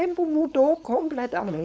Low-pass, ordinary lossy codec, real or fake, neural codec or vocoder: none; none; fake; codec, 16 kHz, 4.8 kbps, FACodec